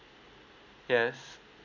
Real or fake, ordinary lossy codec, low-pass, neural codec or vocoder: real; none; 7.2 kHz; none